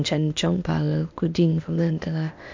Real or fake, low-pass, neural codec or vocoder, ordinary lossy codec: fake; 7.2 kHz; codec, 16 kHz, 0.8 kbps, ZipCodec; MP3, 48 kbps